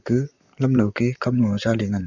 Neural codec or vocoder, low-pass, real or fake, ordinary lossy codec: vocoder, 44.1 kHz, 128 mel bands, Pupu-Vocoder; 7.2 kHz; fake; none